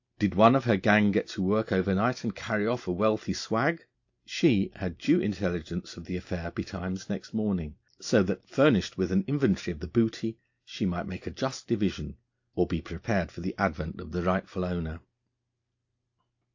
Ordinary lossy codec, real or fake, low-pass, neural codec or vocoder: AAC, 48 kbps; real; 7.2 kHz; none